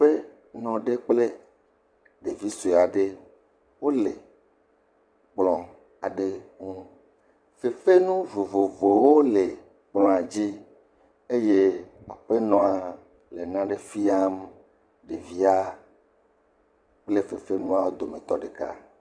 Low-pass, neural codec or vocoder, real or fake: 9.9 kHz; vocoder, 22.05 kHz, 80 mel bands, WaveNeXt; fake